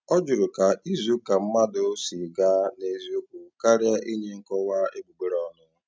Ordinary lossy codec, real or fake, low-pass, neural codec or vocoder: none; real; none; none